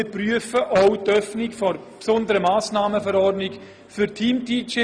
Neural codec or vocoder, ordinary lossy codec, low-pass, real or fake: none; Opus, 64 kbps; 9.9 kHz; real